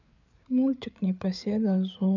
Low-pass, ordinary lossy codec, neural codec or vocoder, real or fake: 7.2 kHz; none; codec, 16 kHz, 8 kbps, FreqCodec, larger model; fake